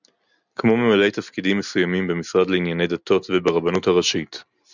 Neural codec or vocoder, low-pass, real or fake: none; 7.2 kHz; real